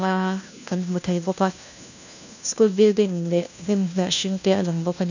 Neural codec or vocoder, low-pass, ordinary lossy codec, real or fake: codec, 16 kHz, 1 kbps, FunCodec, trained on LibriTTS, 50 frames a second; 7.2 kHz; none; fake